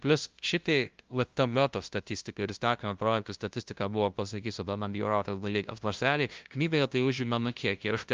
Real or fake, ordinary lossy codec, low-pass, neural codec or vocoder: fake; Opus, 24 kbps; 7.2 kHz; codec, 16 kHz, 0.5 kbps, FunCodec, trained on LibriTTS, 25 frames a second